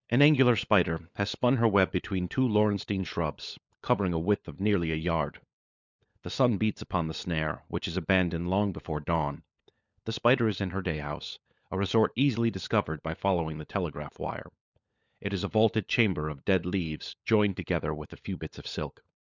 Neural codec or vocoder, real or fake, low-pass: codec, 16 kHz, 16 kbps, FunCodec, trained on LibriTTS, 50 frames a second; fake; 7.2 kHz